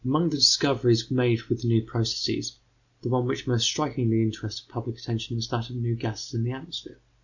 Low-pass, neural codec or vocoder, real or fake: 7.2 kHz; none; real